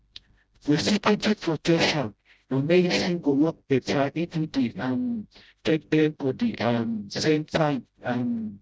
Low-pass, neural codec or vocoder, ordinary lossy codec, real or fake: none; codec, 16 kHz, 0.5 kbps, FreqCodec, smaller model; none; fake